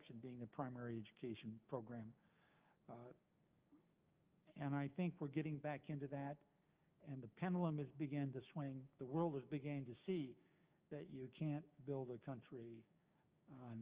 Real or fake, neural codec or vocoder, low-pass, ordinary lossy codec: fake; codec, 16 kHz, 6 kbps, DAC; 3.6 kHz; Opus, 24 kbps